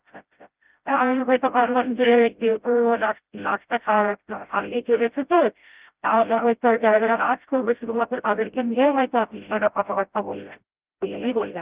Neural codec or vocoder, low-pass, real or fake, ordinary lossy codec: codec, 16 kHz, 0.5 kbps, FreqCodec, smaller model; 3.6 kHz; fake; Opus, 32 kbps